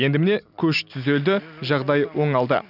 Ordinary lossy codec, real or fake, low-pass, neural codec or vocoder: none; real; 5.4 kHz; none